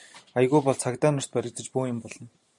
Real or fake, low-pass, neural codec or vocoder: real; 10.8 kHz; none